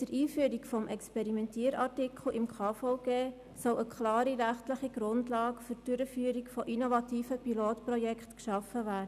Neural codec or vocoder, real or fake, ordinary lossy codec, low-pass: none; real; none; 14.4 kHz